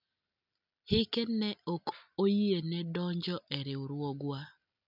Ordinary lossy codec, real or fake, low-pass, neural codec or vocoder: none; real; 5.4 kHz; none